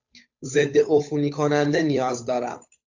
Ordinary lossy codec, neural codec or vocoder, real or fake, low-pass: AAC, 48 kbps; codec, 16 kHz, 8 kbps, FunCodec, trained on Chinese and English, 25 frames a second; fake; 7.2 kHz